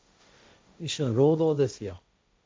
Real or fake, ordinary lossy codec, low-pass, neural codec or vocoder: fake; none; none; codec, 16 kHz, 1.1 kbps, Voila-Tokenizer